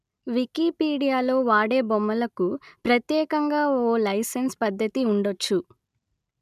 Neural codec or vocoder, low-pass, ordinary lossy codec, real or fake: none; 14.4 kHz; none; real